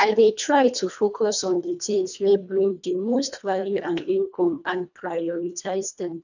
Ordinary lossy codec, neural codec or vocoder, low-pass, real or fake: none; codec, 24 kHz, 1.5 kbps, HILCodec; 7.2 kHz; fake